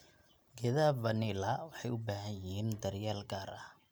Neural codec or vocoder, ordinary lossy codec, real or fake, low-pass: none; none; real; none